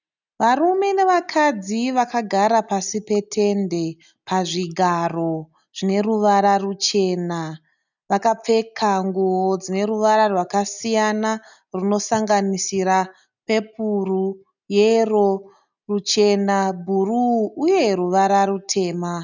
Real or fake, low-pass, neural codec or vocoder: real; 7.2 kHz; none